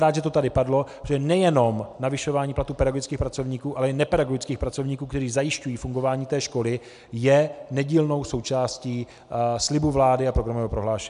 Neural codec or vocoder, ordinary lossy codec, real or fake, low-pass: none; MP3, 96 kbps; real; 10.8 kHz